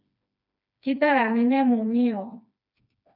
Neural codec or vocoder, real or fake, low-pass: codec, 16 kHz, 2 kbps, FreqCodec, smaller model; fake; 5.4 kHz